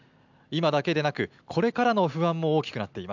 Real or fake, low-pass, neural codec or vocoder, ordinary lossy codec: real; 7.2 kHz; none; none